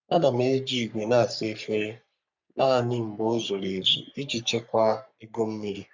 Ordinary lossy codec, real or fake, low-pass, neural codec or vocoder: MP3, 64 kbps; fake; 7.2 kHz; codec, 44.1 kHz, 3.4 kbps, Pupu-Codec